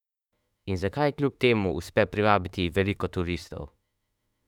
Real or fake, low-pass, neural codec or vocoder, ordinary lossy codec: fake; 19.8 kHz; autoencoder, 48 kHz, 32 numbers a frame, DAC-VAE, trained on Japanese speech; none